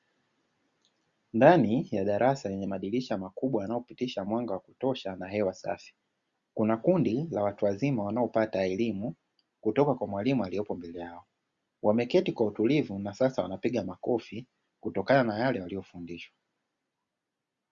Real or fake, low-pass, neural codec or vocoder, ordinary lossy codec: real; 7.2 kHz; none; Opus, 64 kbps